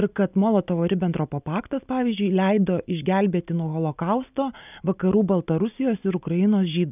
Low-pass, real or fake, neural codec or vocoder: 3.6 kHz; real; none